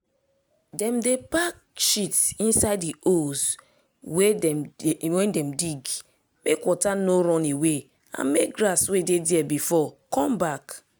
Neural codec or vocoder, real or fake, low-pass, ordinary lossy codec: none; real; none; none